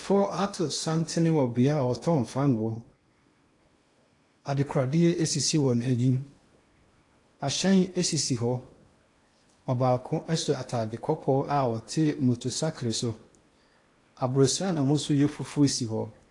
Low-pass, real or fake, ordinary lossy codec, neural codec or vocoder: 10.8 kHz; fake; AAC, 48 kbps; codec, 16 kHz in and 24 kHz out, 0.8 kbps, FocalCodec, streaming, 65536 codes